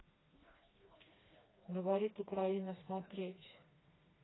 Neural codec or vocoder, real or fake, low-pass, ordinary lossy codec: codec, 16 kHz, 2 kbps, FreqCodec, smaller model; fake; 7.2 kHz; AAC, 16 kbps